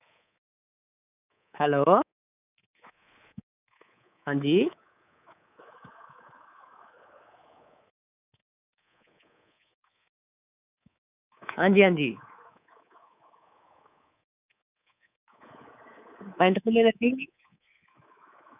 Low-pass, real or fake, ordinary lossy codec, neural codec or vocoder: 3.6 kHz; fake; none; codec, 24 kHz, 3.1 kbps, DualCodec